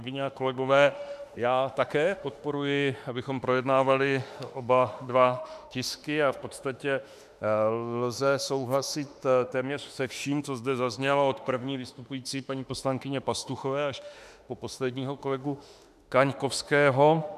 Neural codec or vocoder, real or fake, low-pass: autoencoder, 48 kHz, 32 numbers a frame, DAC-VAE, trained on Japanese speech; fake; 14.4 kHz